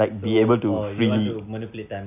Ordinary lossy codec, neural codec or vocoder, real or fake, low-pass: none; none; real; 3.6 kHz